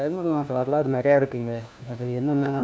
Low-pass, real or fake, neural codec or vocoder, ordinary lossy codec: none; fake; codec, 16 kHz, 1 kbps, FunCodec, trained on LibriTTS, 50 frames a second; none